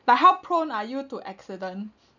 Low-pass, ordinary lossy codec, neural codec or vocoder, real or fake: 7.2 kHz; none; none; real